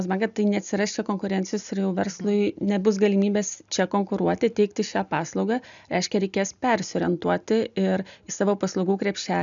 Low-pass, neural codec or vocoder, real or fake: 7.2 kHz; none; real